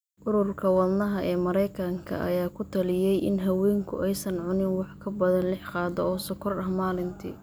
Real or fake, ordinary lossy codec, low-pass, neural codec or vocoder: real; none; none; none